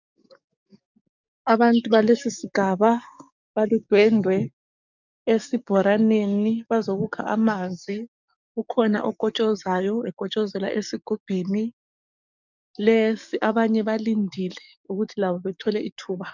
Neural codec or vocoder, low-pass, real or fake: codec, 44.1 kHz, 7.8 kbps, DAC; 7.2 kHz; fake